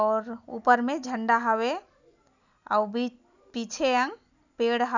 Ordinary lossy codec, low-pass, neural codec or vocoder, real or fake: none; 7.2 kHz; none; real